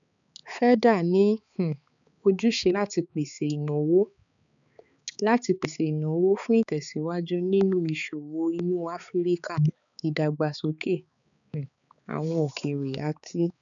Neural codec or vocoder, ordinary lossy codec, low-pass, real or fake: codec, 16 kHz, 4 kbps, X-Codec, HuBERT features, trained on balanced general audio; none; 7.2 kHz; fake